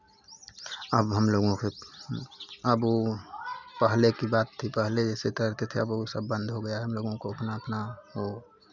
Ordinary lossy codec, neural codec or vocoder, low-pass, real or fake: none; none; 7.2 kHz; real